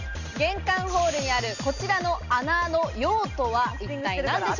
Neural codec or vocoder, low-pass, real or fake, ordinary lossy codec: none; 7.2 kHz; real; none